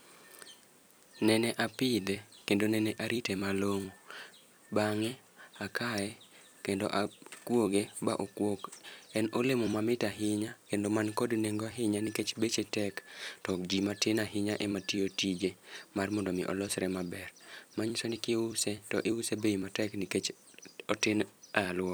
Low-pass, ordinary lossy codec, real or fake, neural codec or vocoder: none; none; fake; vocoder, 44.1 kHz, 128 mel bands every 256 samples, BigVGAN v2